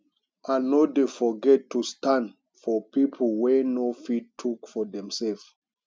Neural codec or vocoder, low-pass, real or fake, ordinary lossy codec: none; none; real; none